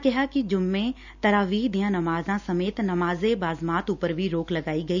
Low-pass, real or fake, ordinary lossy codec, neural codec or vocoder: 7.2 kHz; real; none; none